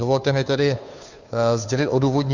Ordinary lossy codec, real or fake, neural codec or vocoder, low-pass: Opus, 64 kbps; fake; codec, 16 kHz, 2 kbps, FunCodec, trained on Chinese and English, 25 frames a second; 7.2 kHz